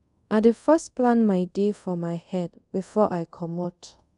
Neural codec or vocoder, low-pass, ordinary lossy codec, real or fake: codec, 24 kHz, 0.5 kbps, DualCodec; 10.8 kHz; none; fake